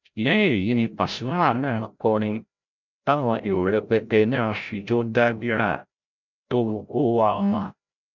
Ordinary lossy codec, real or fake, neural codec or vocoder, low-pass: none; fake; codec, 16 kHz, 0.5 kbps, FreqCodec, larger model; 7.2 kHz